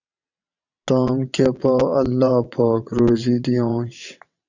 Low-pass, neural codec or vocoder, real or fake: 7.2 kHz; vocoder, 22.05 kHz, 80 mel bands, WaveNeXt; fake